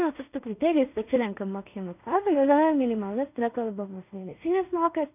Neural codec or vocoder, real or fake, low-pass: codec, 16 kHz in and 24 kHz out, 0.4 kbps, LongCat-Audio-Codec, two codebook decoder; fake; 3.6 kHz